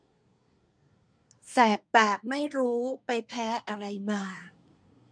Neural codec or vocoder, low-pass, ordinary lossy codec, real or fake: codec, 24 kHz, 1 kbps, SNAC; 9.9 kHz; MP3, 64 kbps; fake